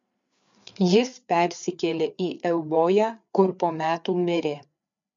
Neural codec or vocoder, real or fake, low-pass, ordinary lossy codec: codec, 16 kHz, 4 kbps, FreqCodec, larger model; fake; 7.2 kHz; MP3, 64 kbps